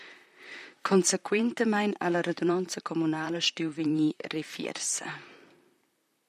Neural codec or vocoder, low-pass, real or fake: vocoder, 44.1 kHz, 128 mel bands, Pupu-Vocoder; 14.4 kHz; fake